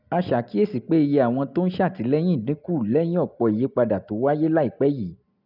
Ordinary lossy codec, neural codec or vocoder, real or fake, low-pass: none; none; real; 5.4 kHz